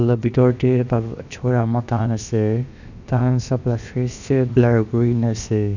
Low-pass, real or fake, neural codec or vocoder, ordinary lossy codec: 7.2 kHz; fake; codec, 16 kHz, about 1 kbps, DyCAST, with the encoder's durations; none